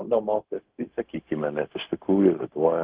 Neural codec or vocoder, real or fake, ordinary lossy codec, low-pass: codec, 16 kHz, 0.4 kbps, LongCat-Audio-Codec; fake; Opus, 16 kbps; 3.6 kHz